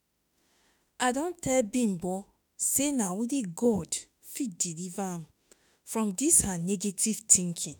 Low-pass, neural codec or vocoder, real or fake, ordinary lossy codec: none; autoencoder, 48 kHz, 32 numbers a frame, DAC-VAE, trained on Japanese speech; fake; none